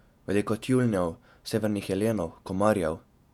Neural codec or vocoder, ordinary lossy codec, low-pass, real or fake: vocoder, 48 kHz, 128 mel bands, Vocos; none; 19.8 kHz; fake